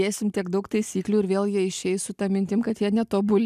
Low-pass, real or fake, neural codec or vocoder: 14.4 kHz; real; none